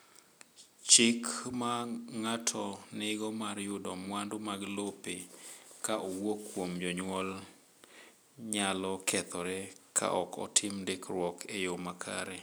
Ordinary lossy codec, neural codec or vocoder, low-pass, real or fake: none; none; none; real